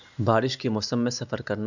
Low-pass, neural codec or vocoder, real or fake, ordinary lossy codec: 7.2 kHz; none; real; none